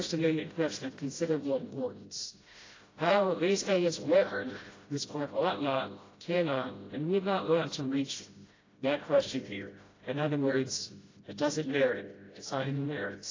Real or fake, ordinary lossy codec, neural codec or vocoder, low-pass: fake; AAC, 32 kbps; codec, 16 kHz, 0.5 kbps, FreqCodec, smaller model; 7.2 kHz